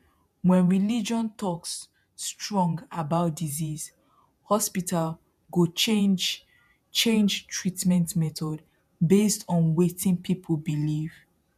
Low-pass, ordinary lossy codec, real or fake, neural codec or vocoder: 14.4 kHz; MP3, 96 kbps; fake; vocoder, 48 kHz, 128 mel bands, Vocos